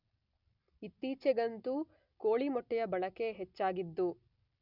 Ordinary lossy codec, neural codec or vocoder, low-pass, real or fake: none; none; 5.4 kHz; real